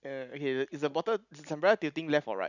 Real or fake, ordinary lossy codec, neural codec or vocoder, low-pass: real; none; none; 7.2 kHz